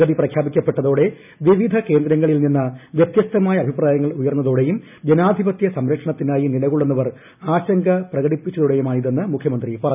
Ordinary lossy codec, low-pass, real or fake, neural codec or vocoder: none; 3.6 kHz; real; none